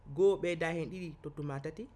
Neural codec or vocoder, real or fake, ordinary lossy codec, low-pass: none; real; none; none